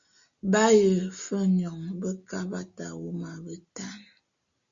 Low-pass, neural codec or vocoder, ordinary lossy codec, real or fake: 7.2 kHz; none; Opus, 64 kbps; real